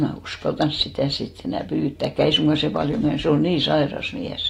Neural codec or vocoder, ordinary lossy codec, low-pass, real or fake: none; AAC, 48 kbps; 19.8 kHz; real